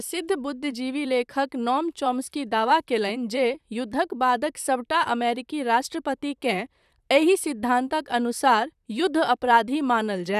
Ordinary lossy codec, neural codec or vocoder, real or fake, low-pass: none; vocoder, 44.1 kHz, 128 mel bands, Pupu-Vocoder; fake; 14.4 kHz